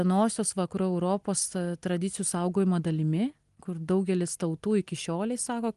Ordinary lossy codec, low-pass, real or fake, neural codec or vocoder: Opus, 24 kbps; 10.8 kHz; real; none